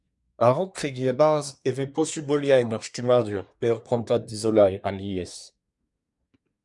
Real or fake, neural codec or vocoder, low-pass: fake; codec, 24 kHz, 1 kbps, SNAC; 10.8 kHz